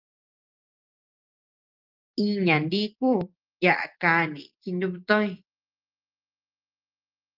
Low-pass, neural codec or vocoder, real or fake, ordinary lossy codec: 5.4 kHz; none; real; Opus, 32 kbps